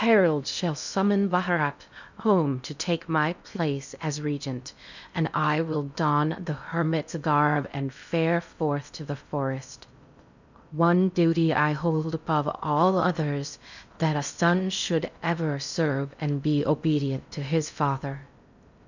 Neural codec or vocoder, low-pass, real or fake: codec, 16 kHz in and 24 kHz out, 0.6 kbps, FocalCodec, streaming, 2048 codes; 7.2 kHz; fake